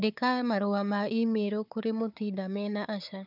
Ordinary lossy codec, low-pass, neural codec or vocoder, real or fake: none; 5.4 kHz; codec, 16 kHz, 4 kbps, FunCodec, trained on Chinese and English, 50 frames a second; fake